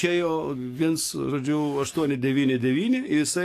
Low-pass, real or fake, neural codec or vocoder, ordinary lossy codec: 14.4 kHz; fake; codec, 44.1 kHz, 7.8 kbps, DAC; AAC, 64 kbps